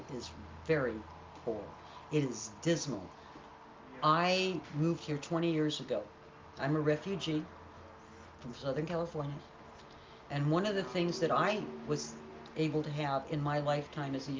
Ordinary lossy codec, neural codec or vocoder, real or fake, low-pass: Opus, 24 kbps; none; real; 7.2 kHz